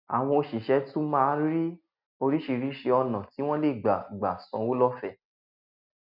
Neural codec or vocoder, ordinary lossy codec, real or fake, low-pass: none; none; real; 5.4 kHz